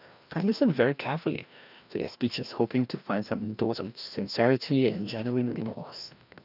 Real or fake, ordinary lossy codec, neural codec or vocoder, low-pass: fake; none; codec, 16 kHz, 1 kbps, FreqCodec, larger model; 5.4 kHz